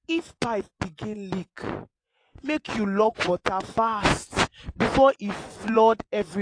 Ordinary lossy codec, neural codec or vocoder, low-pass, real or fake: AAC, 48 kbps; vocoder, 24 kHz, 100 mel bands, Vocos; 9.9 kHz; fake